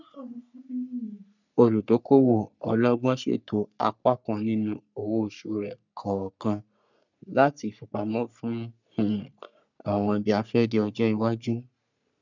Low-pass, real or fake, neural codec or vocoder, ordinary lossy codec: 7.2 kHz; fake; codec, 44.1 kHz, 3.4 kbps, Pupu-Codec; none